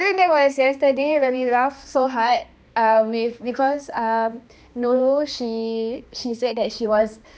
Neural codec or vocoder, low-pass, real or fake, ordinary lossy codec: codec, 16 kHz, 2 kbps, X-Codec, HuBERT features, trained on balanced general audio; none; fake; none